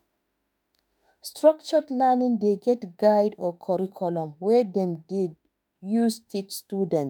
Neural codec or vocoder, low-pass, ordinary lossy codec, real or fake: autoencoder, 48 kHz, 32 numbers a frame, DAC-VAE, trained on Japanese speech; none; none; fake